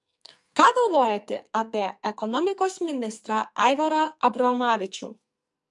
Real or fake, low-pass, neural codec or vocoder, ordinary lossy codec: fake; 10.8 kHz; codec, 44.1 kHz, 2.6 kbps, SNAC; MP3, 64 kbps